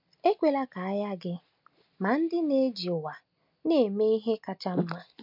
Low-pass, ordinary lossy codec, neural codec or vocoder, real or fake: 5.4 kHz; MP3, 48 kbps; none; real